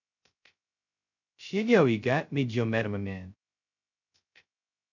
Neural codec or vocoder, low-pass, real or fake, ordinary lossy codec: codec, 16 kHz, 0.2 kbps, FocalCodec; 7.2 kHz; fake; none